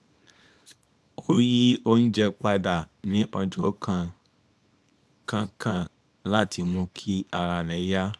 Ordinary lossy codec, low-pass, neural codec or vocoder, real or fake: none; none; codec, 24 kHz, 0.9 kbps, WavTokenizer, small release; fake